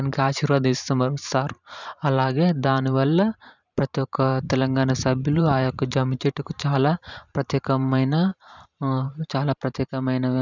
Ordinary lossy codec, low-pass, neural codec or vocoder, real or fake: none; 7.2 kHz; vocoder, 44.1 kHz, 128 mel bands every 512 samples, BigVGAN v2; fake